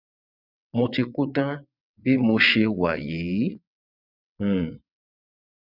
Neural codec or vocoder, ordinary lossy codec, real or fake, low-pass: vocoder, 22.05 kHz, 80 mel bands, WaveNeXt; none; fake; 5.4 kHz